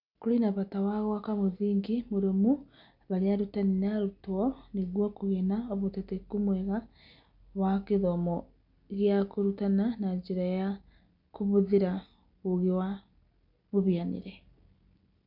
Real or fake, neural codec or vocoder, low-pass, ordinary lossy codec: real; none; 5.4 kHz; none